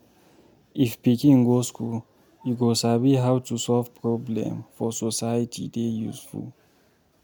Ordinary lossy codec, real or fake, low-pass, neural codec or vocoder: none; real; none; none